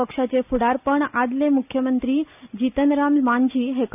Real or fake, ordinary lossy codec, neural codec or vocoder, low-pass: real; none; none; 3.6 kHz